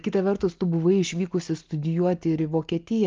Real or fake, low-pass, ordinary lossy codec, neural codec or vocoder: real; 7.2 kHz; Opus, 32 kbps; none